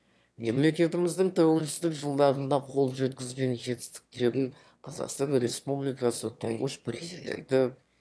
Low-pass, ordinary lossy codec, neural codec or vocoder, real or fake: none; none; autoencoder, 22.05 kHz, a latent of 192 numbers a frame, VITS, trained on one speaker; fake